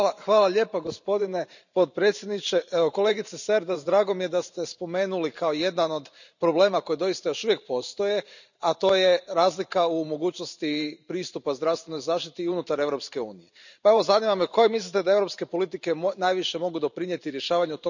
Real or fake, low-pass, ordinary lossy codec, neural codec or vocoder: fake; 7.2 kHz; none; vocoder, 44.1 kHz, 128 mel bands every 512 samples, BigVGAN v2